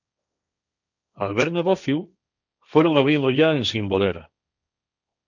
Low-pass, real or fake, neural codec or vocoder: 7.2 kHz; fake; codec, 16 kHz, 1.1 kbps, Voila-Tokenizer